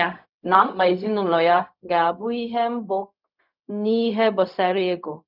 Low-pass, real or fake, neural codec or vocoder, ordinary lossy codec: 5.4 kHz; fake; codec, 16 kHz, 0.4 kbps, LongCat-Audio-Codec; none